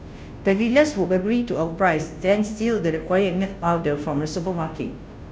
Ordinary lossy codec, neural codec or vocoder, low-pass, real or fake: none; codec, 16 kHz, 0.5 kbps, FunCodec, trained on Chinese and English, 25 frames a second; none; fake